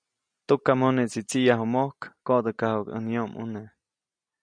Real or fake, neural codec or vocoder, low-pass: real; none; 9.9 kHz